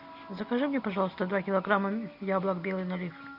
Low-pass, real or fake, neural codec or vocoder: 5.4 kHz; real; none